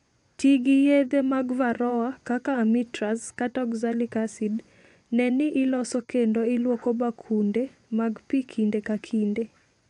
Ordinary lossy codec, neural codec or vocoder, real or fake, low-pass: none; vocoder, 24 kHz, 100 mel bands, Vocos; fake; 10.8 kHz